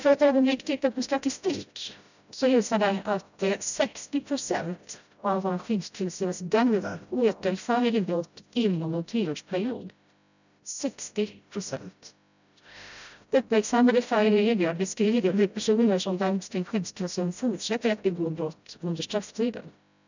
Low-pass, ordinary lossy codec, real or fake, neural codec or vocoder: 7.2 kHz; none; fake; codec, 16 kHz, 0.5 kbps, FreqCodec, smaller model